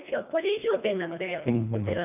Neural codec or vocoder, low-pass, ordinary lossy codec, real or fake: codec, 24 kHz, 1.5 kbps, HILCodec; 3.6 kHz; none; fake